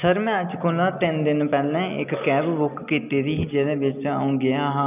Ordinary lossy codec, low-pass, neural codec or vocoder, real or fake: none; 3.6 kHz; none; real